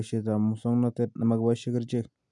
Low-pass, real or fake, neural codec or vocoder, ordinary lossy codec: 10.8 kHz; real; none; none